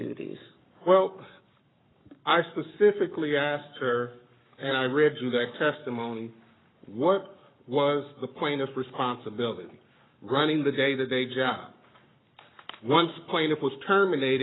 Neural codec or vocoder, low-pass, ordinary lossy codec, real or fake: codec, 44.1 kHz, 7.8 kbps, Pupu-Codec; 7.2 kHz; AAC, 16 kbps; fake